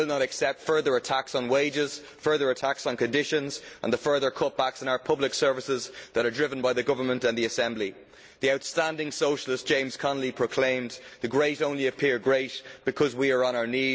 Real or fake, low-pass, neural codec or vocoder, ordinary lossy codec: real; none; none; none